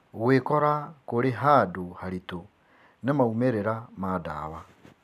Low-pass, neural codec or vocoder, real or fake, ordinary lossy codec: 14.4 kHz; none; real; none